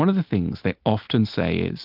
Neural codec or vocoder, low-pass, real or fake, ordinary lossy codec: none; 5.4 kHz; real; Opus, 32 kbps